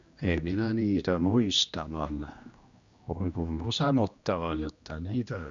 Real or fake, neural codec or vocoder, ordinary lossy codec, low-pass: fake; codec, 16 kHz, 1 kbps, X-Codec, HuBERT features, trained on general audio; Opus, 64 kbps; 7.2 kHz